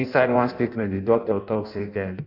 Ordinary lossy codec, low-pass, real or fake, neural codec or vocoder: none; 5.4 kHz; fake; codec, 16 kHz in and 24 kHz out, 0.6 kbps, FireRedTTS-2 codec